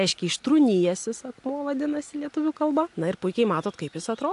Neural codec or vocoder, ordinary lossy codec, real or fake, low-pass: none; AAC, 64 kbps; real; 10.8 kHz